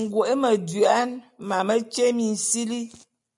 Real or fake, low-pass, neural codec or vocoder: real; 10.8 kHz; none